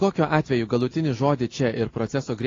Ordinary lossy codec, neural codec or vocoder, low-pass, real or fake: AAC, 32 kbps; none; 7.2 kHz; real